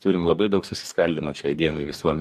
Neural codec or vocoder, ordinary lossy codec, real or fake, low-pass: codec, 44.1 kHz, 2.6 kbps, DAC; AAC, 96 kbps; fake; 14.4 kHz